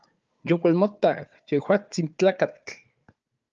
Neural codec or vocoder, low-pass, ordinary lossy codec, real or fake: codec, 16 kHz, 4 kbps, FunCodec, trained on Chinese and English, 50 frames a second; 7.2 kHz; Opus, 24 kbps; fake